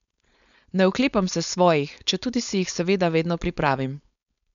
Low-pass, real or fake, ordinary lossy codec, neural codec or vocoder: 7.2 kHz; fake; none; codec, 16 kHz, 4.8 kbps, FACodec